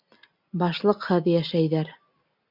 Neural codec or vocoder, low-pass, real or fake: none; 5.4 kHz; real